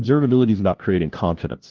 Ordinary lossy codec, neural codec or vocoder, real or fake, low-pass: Opus, 16 kbps; codec, 16 kHz, 0.5 kbps, FunCodec, trained on LibriTTS, 25 frames a second; fake; 7.2 kHz